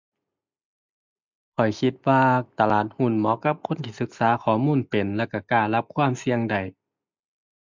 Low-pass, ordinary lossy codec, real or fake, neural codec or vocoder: 7.2 kHz; MP3, 64 kbps; real; none